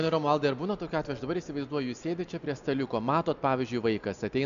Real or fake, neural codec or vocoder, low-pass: real; none; 7.2 kHz